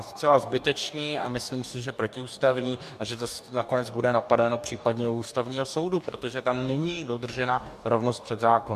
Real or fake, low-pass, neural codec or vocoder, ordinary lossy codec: fake; 14.4 kHz; codec, 44.1 kHz, 2.6 kbps, DAC; MP3, 96 kbps